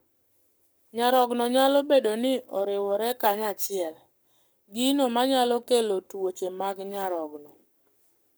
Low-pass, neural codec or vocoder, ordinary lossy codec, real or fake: none; codec, 44.1 kHz, 7.8 kbps, Pupu-Codec; none; fake